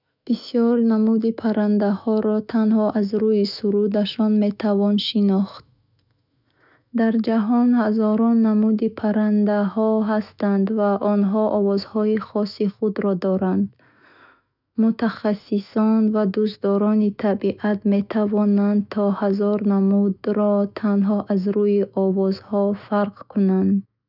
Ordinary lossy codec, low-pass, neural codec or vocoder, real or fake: none; 5.4 kHz; autoencoder, 48 kHz, 128 numbers a frame, DAC-VAE, trained on Japanese speech; fake